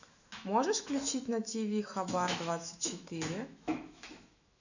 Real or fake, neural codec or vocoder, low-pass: fake; autoencoder, 48 kHz, 128 numbers a frame, DAC-VAE, trained on Japanese speech; 7.2 kHz